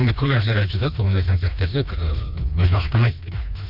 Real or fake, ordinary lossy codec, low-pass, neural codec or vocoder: fake; none; 5.4 kHz; codec, 16 kHz, 2 kbps, FreqCodec, smaller model